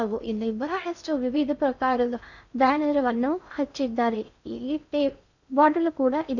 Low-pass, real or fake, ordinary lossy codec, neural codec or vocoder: 7.2 kHz; fake; none; codec, 16 kHz in and 24 kHz out, 0.6 kbps, FocalCodec, streaming, 4096 codes